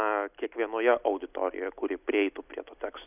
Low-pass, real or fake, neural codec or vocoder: 3.6 kHz; real; none